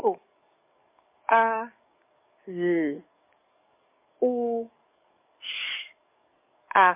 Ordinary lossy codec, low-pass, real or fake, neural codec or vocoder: MP3, 24 kbps; 3.6 kHz; fake; codec, 44.1 kHz, 7.8 kbps, DAC